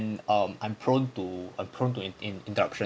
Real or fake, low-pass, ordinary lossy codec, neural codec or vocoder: real; none; none; none